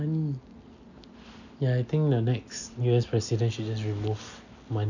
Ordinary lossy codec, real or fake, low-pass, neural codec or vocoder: none; real; 7.2 kHz; none